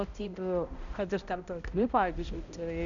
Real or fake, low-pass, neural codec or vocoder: fake; 7.2 kHz; codec, 16 kHz, 0.5 kbps, X-Codec, HuBERT features, trained on balanced general audio